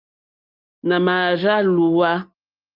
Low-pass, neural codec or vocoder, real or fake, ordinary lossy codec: 5.4 kHz; none; real; Opus, 24 kbps